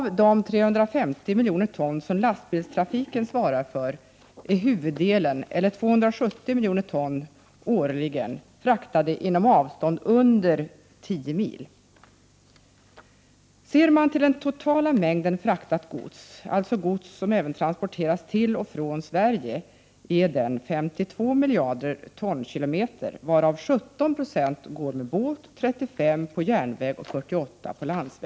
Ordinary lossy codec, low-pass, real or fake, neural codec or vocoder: none; none; real; none